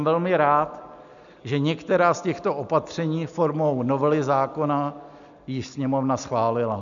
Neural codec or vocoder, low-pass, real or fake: none; 7.2 kHz; real